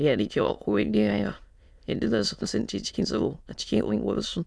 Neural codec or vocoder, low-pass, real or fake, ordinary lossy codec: autoencoder, 22.05 kHz, a latent of 192 numbers a frame, VITS, trained on many speakers; none; fake; none